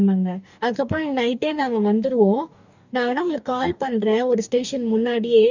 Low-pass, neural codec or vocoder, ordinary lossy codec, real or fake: 7.2 kHz; codec, 44.1 kHz, 2.6 kbps, DAC; none; fake